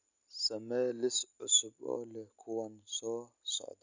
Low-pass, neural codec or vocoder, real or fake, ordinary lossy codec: 7.2 kHz; none; real; none